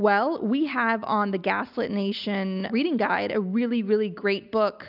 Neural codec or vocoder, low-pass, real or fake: none; 5.4 kHz; real